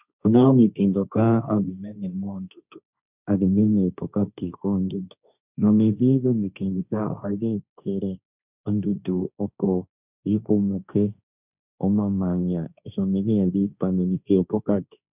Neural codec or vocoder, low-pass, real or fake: codec, 16 kHz, 1.1 kbps, Voila-Tokenizer; 3.6 kHz; fake